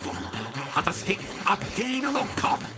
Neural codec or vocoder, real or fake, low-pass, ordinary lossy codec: codec, 16 kHz, 4.8 kbps, FACodec; fake; none; none